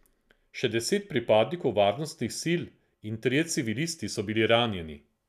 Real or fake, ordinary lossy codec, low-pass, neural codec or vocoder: real; none; 14.4 kHz; none